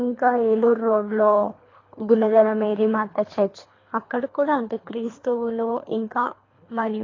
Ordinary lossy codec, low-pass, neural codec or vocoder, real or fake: AAC, 32 kbps; 7.2 kHz; codec, 24 kHz, 3 kbps, HILCodec; fake